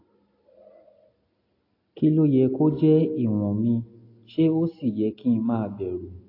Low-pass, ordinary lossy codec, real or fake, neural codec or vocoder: 5.4 kHz; AAC, 32 kbps; real; none